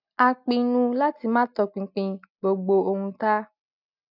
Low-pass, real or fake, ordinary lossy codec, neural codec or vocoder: 5.4 kHz; real; none; none